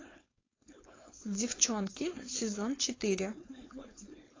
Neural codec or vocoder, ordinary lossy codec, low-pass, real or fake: codec, 16 kHz, 4.8 kbps, FACodec; AAC, 32 kbps; 7.2 kHz; fake